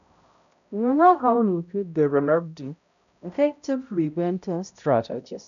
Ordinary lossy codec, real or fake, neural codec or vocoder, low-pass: none; fake; codec, 16 kHz, 0.5 kbps, X-Codec, HuBERT features, trained on balanced general audio; 7.2 kHz